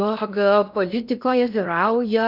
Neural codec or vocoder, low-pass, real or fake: codec, 16 kHz in and 24 kHz out, 0.6 kbps, FocalCodec, streaming, 2048 codes; 5.4 kHz; fake